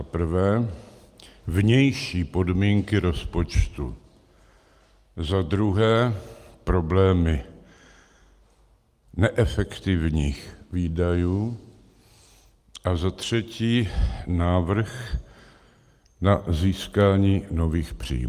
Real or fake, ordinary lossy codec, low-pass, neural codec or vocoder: real; Opus, 32 kbps; 14.4 kHz; none